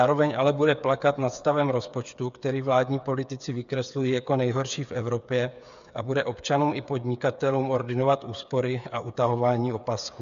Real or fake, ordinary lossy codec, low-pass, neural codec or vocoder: fake; AAC, 96 kbps; 7.2 kHz; codec, 16 kHz, 8 kbps, FreqCodec, smaller model